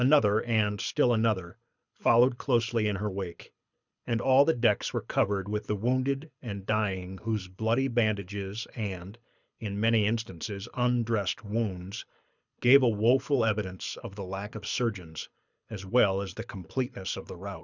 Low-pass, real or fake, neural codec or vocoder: 7.2 kHz; fake; codec, 24 kHz, 6 kbps, HILCodec